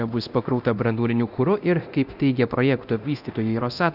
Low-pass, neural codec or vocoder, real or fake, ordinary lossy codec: 5.4 kHz; codec, 24 kHz, 0.9 kbps, DualCodec; fake; Opus, 64 kbps